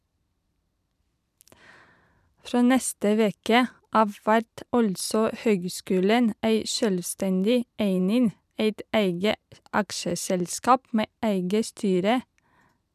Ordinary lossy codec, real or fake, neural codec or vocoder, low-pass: none; fake; vocoder, 44.1 kHz, 128 mel bands every 512 samples, BigVGAN v2; 14.4 kHz